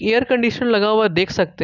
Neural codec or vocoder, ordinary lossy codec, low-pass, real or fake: none; none; 7.2 kHz; real